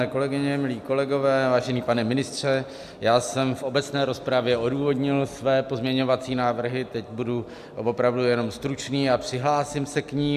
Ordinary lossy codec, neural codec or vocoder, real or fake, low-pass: AAC, 96 kbps; none; real; 14.4 kHz